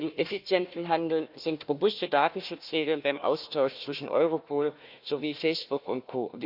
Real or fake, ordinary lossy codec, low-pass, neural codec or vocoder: fake; none; 5.4 kHz; codec, 16 kHz, 1 kbps, FunCodec, trained on Chinese and English, 50 frames a second